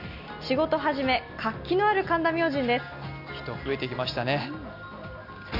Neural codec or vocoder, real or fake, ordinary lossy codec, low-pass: none; real; none; 5.4 kHz